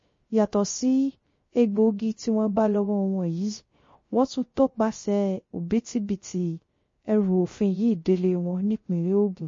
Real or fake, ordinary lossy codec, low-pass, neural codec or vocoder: fake; MP3, 32 kbps; 7.2 kHz; codec, 16 kHz, 0.3 kbps, FocalCodec